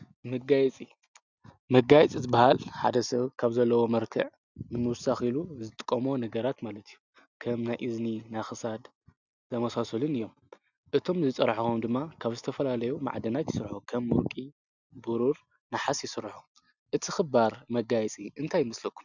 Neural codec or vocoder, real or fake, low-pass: none; real; 7.2 kHz